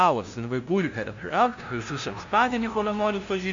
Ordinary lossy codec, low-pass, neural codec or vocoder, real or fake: MP3, 64 kbps; 7.2 kHz; codec, 16 kHz, 0.5 kbps, FunCodec, trained on LibriTTS, 25 frames a second; fake